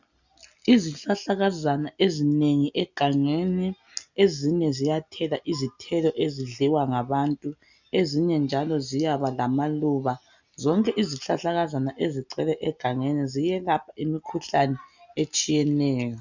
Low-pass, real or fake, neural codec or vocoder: 7.2 kHz; real; none